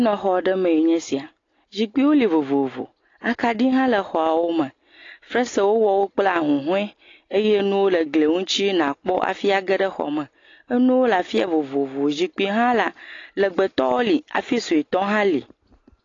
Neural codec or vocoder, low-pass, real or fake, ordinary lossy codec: none; 7.2 kHz; real; AAC, 32 kbps